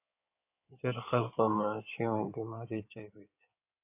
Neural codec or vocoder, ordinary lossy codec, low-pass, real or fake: codec, 16 kHz in and 24 kHz out, 2.2 kbps, FireRedTTS-2 codec; AAC, 32 kbps; 3.6 kHz; fake